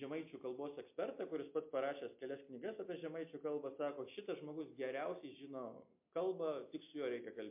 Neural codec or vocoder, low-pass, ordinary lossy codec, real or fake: none; 3.6 kHz; MP3, 32 kbps; real